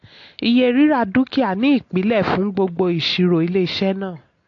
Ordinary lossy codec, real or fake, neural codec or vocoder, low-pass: AAC, 48 kbps; real; none; 7.2 kHz